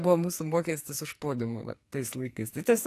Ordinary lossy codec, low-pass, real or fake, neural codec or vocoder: AAC, 64 kbps; 14.4 kHz; fake; codec, 44.1 kHz, 2.6 kbps, SNAC